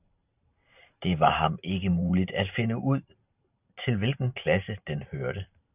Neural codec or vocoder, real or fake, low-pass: vocoder, 44.1 kHz, 128 mel bands every 512 samples, BigVGAN v2; fake; 3.6 kHz